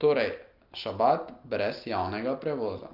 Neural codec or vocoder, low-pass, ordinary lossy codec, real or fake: none; 5.4 kHz; Opus, 24 kbps; real